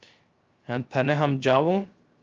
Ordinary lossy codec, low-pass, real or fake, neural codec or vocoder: Opus, 24 kbps; 7.2 kHz; fake; codec, 16 kHz, 0.3 kbps, FocalCodec